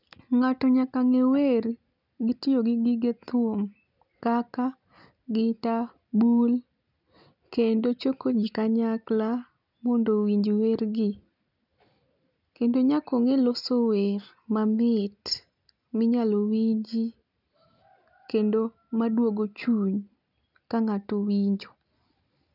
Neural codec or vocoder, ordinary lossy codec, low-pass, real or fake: none; none; 5.4 kHz; real